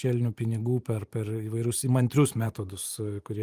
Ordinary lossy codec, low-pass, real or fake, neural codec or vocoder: Opus, 32 kbps; 14.4 kHz; fake; vocoder, 44.1 kHz, 128 mel bands every 512 samples, BigVGAN v2